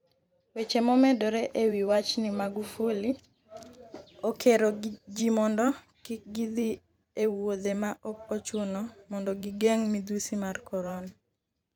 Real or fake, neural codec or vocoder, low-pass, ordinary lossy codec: fake; vocoder, 44.1 kHz, 128 mel bands every 512 samples, BigVGAN v2; 19.8 kHz; none